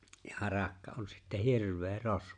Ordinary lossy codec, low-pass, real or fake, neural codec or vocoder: none; 9.9 kHz; real; none